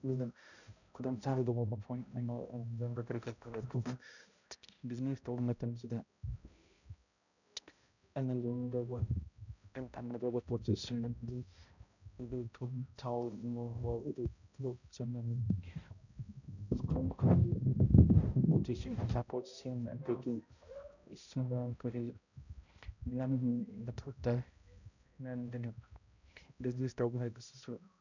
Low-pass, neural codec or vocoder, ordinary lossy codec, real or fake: 7.2 kHz; codec, 16 kHz, 0.5 kbps, X-Codec, HuBERT features, trained on balanced general audio; none; fake